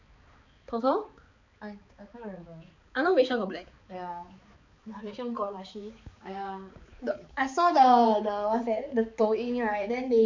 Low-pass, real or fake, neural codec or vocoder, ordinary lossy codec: 7.2 kHz; fake; codec, 16 kHz, 4 kbps, X-Codec, HuBERT features, trained on balanced general audio; MP3, 64 kbps